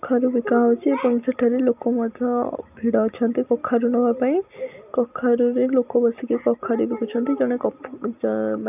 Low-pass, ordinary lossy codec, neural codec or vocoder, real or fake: 3.6 kHz; none; none; real